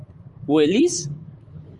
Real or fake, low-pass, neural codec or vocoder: fake; 10.8 kHz; vocoder, 44.1 kHz, 128 mel bands, Pupu-Vocoder